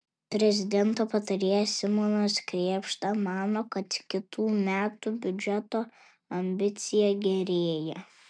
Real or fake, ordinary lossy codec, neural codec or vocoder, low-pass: real; MP3, 96 kbps; none; 9.9 kHz